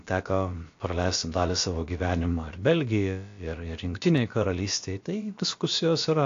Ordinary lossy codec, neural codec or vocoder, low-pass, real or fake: AAC, 48 kbps; codec, 16 kHz, about 1 kbps, DyCAST, with the encoder's durations; 7.2 kHz; fake